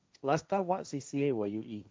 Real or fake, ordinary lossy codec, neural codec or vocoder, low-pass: fake; none; codec, 16 kHz, 1.1 kbps, Voila-Tokenizer; none